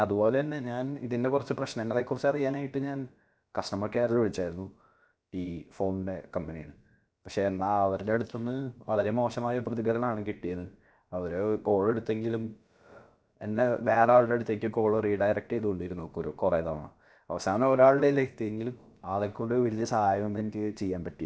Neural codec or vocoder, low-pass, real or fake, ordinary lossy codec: codec, 16 kHz, about 1 kbps, DyCAST, with the encoder's durations; none; fake; none